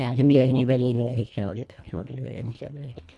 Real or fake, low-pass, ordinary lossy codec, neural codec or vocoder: fake; none; none; codec, 24 kHz, 1.5 kbps, HILCodec